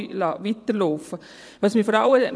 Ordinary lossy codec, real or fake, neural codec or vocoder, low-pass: none; fake; vocoder, 22.05 kHz, 80 mel bands, WaveNeXt; none